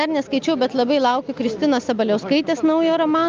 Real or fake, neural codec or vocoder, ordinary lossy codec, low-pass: real; none; Opus, 24 kbps; 7.2 kHz